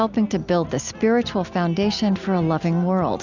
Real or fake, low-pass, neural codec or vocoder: real; 7.2 kHz; none